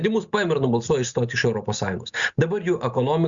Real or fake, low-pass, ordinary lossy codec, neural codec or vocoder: real; 7.2 kHz; Opus, 64 kbps; none